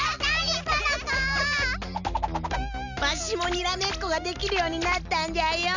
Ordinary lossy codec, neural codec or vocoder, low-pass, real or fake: none; none; 7.2 kHz; real